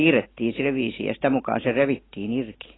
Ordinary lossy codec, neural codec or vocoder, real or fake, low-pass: AAC, 16 kbps; none; real; 7.2 kHz